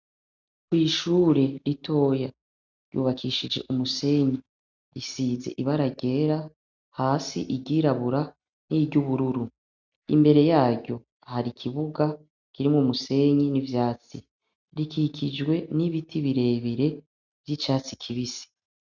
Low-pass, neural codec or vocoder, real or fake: 7.2 kHz; none; real